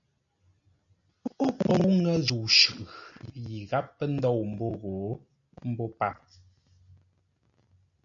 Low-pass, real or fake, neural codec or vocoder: 7.2 kHz; real; none